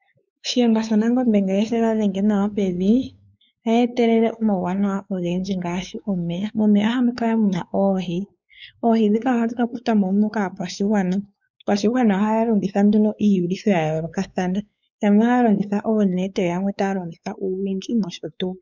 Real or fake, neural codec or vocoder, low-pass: fake; codec, 16 kHz, 4 kbps, X-Codec, WavLM features, trained on Multilingual LibriSpeech; 7.2 kHz